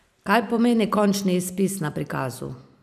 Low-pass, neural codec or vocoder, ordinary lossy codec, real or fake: 14.4 kHz; none; none; real